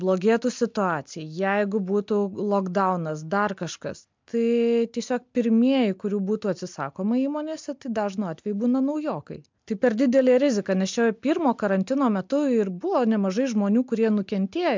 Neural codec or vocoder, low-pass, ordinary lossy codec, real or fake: none; 7.2 kHz; MP3, 64 kbps; real